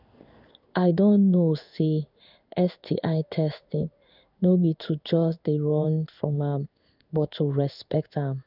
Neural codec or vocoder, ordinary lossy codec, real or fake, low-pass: codec, 16 kHz in and 24 kHz out, 1 kbps, XY-Tokenizer; none; fake; 5.4 kHz